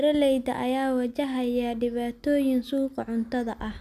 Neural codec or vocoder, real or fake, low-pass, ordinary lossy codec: none; real; 14.4 kHz; none